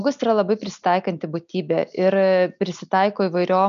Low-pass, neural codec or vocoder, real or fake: 7.2 kHz; none; real